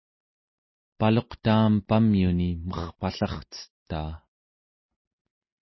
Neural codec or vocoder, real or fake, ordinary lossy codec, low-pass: none; real; MP3, 24 kbps; 7.2 kHz